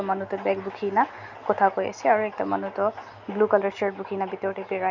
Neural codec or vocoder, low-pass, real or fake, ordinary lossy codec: none; 7.2 kHz; real; none